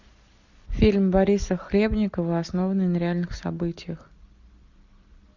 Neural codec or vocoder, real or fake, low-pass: none; real; 7.2 kHz